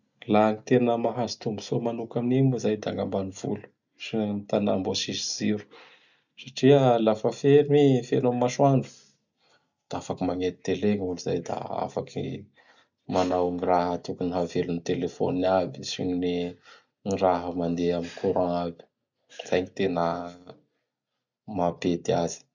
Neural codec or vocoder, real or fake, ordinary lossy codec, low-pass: none; real; none; 7.2 kHz